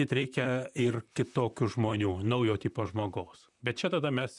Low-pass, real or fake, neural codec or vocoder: 10.8 kHz; fake; vocoder, 44.1 kHz, 128 mel bands, Pupu-Vocoder